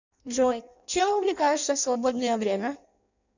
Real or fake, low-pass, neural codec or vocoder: fake; 7.2 kHz; codec, 16 kHz in and 24 kHz out, 0.6 kbps, FireRedTTS-2 codec